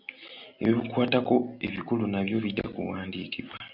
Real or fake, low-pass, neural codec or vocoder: real; 5.4 kHz; none